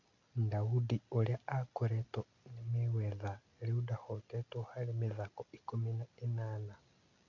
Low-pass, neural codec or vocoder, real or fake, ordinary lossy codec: 7.2 kHz; none; real; none